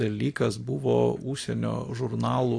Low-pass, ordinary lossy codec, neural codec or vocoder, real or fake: 9.9 kHz; AAC, 48 kbps; none; real